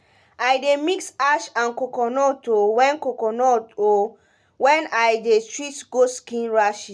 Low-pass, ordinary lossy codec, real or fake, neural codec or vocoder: none; none; real; none